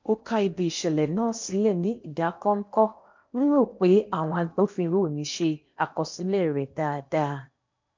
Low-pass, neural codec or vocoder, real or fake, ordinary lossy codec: 7.2 kHz; codec, 16 kHz in and 24 kHz out, 0.8 kbps, FocalCodec, streaming, 65536 codes; fake; MP3, 64 kbps